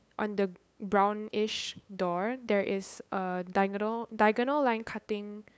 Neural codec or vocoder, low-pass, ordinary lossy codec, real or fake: codec, 16 kHz, 8 kbps, FunCodec, trained on LibriTTS, 25 frames a second; none; none; fake